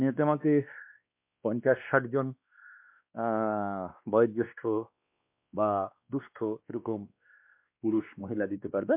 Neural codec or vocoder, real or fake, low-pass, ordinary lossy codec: codec, 16 kHz, 2 kbps, X-Codec, WavLM features, trained on Multilingual LibriSpeech; fake; 3.6 kHz; MP3, 32 kbps